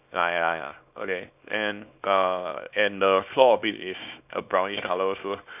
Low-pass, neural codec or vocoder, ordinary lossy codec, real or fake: 3.6 kHz; codec, 24 kHz, 0.9 kbps, WavTokenizer, small release; none; fake